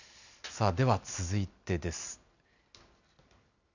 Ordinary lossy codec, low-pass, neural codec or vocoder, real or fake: none; 7.2 kHz; none; real